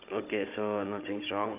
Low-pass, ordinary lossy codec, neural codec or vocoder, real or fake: 3.6 kHz; AAC, 32 kbps; codec, 16 kHz, 16 kbps, FunCodec, trained on Chinese and English, 50 frames a second; fake